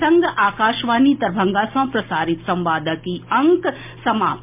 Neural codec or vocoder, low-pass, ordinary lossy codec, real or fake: none; 3.6 kHz; MP3, 32 kbps; real